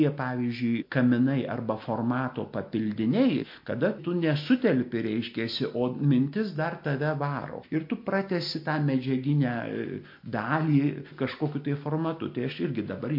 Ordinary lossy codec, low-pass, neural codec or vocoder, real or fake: MP3, 32 kbps; 5.4 kHz; none; real